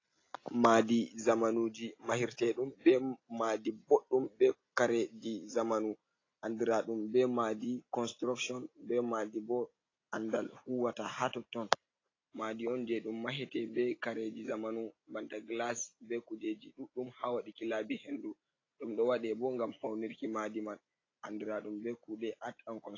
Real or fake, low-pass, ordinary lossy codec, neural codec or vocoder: real; 7.2 kHz; AAC, 32 kbps; none